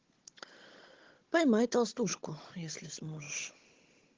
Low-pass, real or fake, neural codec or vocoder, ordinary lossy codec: 7.2 kHz; fake; codec, 16 kHz, 16 kbps, FunCodec, trained on Chinese and English, 50 frames a second; Opus, 16 kbps